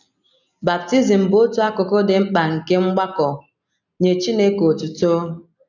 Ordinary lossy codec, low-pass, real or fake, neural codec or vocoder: none; 7.2 kHz; real; none